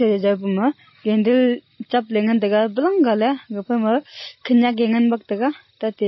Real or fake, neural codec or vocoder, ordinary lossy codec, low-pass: real; none; MP3, 24 kbps; 7.2 kHz